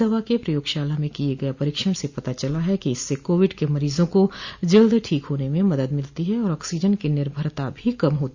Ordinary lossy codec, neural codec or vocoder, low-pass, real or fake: Opus, 64 kbps; none; 7.2 kHz; real